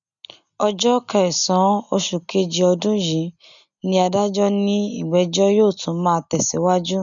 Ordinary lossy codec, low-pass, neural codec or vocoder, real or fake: none; 7.2 kHz; none; real